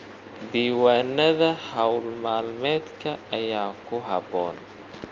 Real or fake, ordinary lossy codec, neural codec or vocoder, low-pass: real; Opus, 32 kbps; none; 7.2 kHz